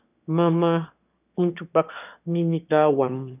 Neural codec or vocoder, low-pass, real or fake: autoencoder, 22.05 kHz, a latent of 192 numbers a frame, VITS, trained on one speaker; 3.6 kHz; fake